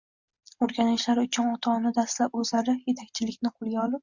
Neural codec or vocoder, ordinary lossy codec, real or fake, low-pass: none; Opus, 64 kbps; real; 7.2 kHz